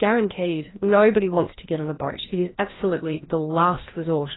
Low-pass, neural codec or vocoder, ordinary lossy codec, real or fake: 7.2 kHz; codec, 16 kHz, 1 kbps, FreqCodec, larger model; AAC, 16 kbps; fake